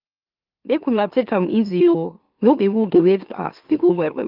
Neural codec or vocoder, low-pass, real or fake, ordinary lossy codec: autoencoder, 44.1 kHz, a latent of 192 numbers a frame, MeloTTS; 5.4 kHz; fake; Opus, 32 kbps